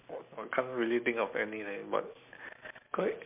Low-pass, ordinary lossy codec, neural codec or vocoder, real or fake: 3.6 kHz; MP3, 32 kbps; none; real